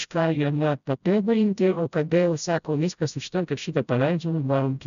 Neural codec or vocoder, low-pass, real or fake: codec, 16 kHz, 0.5 kbps, FreqCodec, smaller model; 7.2 kHz; fake